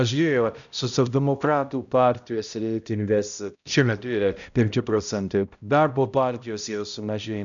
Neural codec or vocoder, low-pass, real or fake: codec, 16 kHz, 0.5 kbps, X-Codec, HuBERT features, trained on balanced general audio; 7.2 kHz; fake